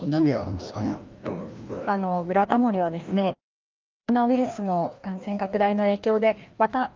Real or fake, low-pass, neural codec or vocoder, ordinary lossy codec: fake; 7.2 kHz; codec, 16 kHz, 1 kbps, FreqCodec, larger model; Opus, 24 kbps